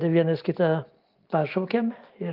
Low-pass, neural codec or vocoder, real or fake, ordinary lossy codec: 5.4 kHz; none; real; Opus, 24 kbps